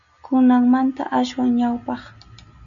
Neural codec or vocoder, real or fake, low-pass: none; real; 7.2 kHz